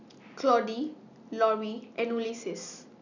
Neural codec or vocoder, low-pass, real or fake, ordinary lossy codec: none; 7.2 kHz; real; none